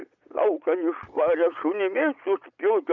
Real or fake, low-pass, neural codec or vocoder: fake; 7.2 kHz; vocoder, 22.05 kHz, 80 mel bands, Vocos